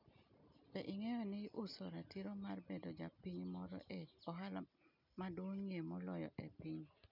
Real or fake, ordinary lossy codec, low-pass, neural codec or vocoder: real; none; 5.4 kHz; none